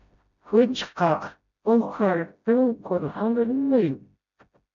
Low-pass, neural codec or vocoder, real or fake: 7.2 kHz; codec, 16 kHz, 0.5 kbps, FreqCodec, smaller model; fake